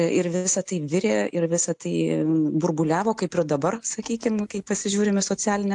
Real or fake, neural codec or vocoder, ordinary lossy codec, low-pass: real; none; AAC, 64 kbps; 10.8 kHz